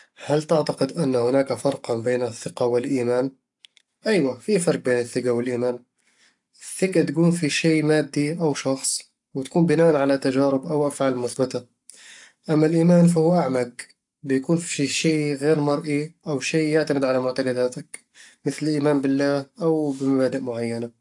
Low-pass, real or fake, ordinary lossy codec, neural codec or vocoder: 10.8 kHz; fake; AAC, 64 kbps; codec, 44.1 kHz, 7.8 kbps, Pupu-Codec